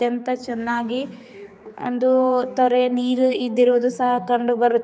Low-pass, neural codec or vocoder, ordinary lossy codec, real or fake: none; codec, 16 kHz, 4 kbps, X-Codec, HuBERT features, trained on general audio; none; fake